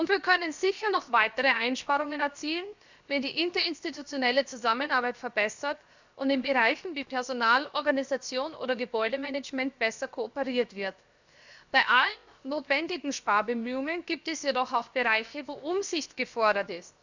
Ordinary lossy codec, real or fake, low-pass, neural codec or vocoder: Opus, 64 kbps; fake; 7.2 kHz; codec, 16 kHz, 0.7 kbps, FocalCodec